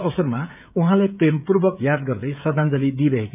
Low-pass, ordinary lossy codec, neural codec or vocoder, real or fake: 3.6 kHz; none; codec, 16 kHz, 16 kbps, FreqCodec, smaller model; fake